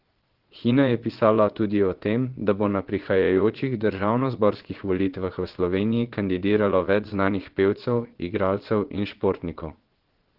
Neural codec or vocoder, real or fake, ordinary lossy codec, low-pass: vocoder, 22.05 kHz, 80 mel bands, Vocos; fake; Opus, 16 kbps; 5.4 kHz